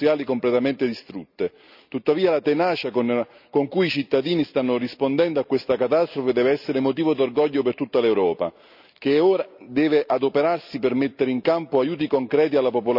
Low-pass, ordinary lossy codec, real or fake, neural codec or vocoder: 5.4 kHz; none; real; none